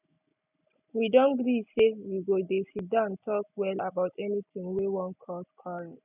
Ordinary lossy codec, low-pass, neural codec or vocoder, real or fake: none; 3.6 kHz; none; real